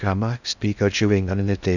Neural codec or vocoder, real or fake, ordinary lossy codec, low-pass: codec, 16 kHz in and 24 kHz out, 0.6 kbps, FocalCodec, streaming, 2048 codes; fake; none; 7.2 kHz